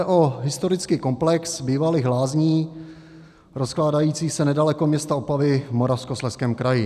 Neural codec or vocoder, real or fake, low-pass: none; real; 14.4 kHz